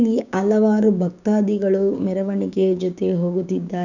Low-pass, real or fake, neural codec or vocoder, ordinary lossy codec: 7.2 kHz; fake; codec, 16 kHz, 6 kbps, DAC; none